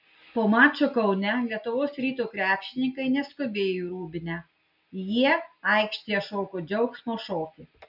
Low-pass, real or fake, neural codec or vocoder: 5.4 kHz; real; none